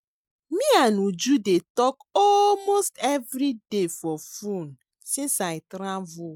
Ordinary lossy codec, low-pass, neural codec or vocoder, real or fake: MP3, 96 kbps; 19.8 kHz; none; real